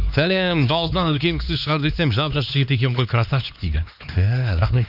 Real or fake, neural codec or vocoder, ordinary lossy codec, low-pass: fake; codec, 16 kHz, 2 kbps, X-Codec, HuBERT features, trained on LibriSpeech; none; 5.4 kHz